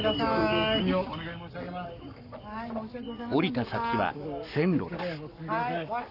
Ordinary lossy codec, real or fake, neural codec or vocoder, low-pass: none; fake; codec, 44.1 kHz, 7.8 kbps, Pupu-Codec; 5.4 kHz